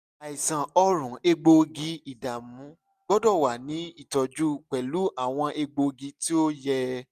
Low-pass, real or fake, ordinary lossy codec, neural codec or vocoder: 14.4 kHz; real; none; none